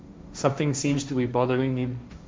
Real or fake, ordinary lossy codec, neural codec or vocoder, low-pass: fake; none; codec, 16 kHz, 1.1 kbps, Voila-Tokenizer; none